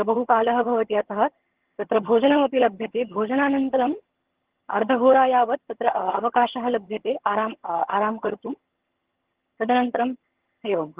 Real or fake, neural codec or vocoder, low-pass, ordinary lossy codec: fake; vocoder, 22.05 kHz, 80 mel bands, HiFi-GAN; 3.6 kHz; Opus, 16 kbps